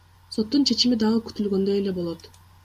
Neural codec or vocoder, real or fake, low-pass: none; real; 14.4 kHz